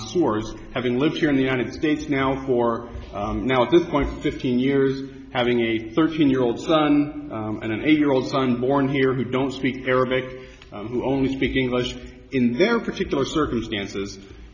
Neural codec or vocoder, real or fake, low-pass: none; real; 7.2 kHz